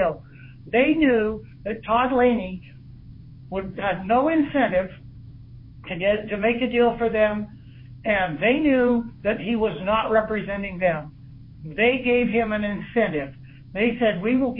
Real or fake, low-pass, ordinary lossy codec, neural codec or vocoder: fake; 5.4 kHz; MP3, 24 kbps; codec, 16 kHz in and 24 kHz out, 1 kbps, XY-Tokenizer